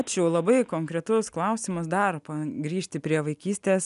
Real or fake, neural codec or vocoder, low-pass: real; none; 10.8 kHz